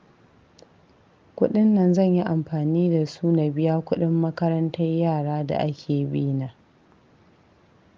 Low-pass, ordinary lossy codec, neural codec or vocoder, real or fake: 7.2 kHz; Opus, 32 kbps; none; real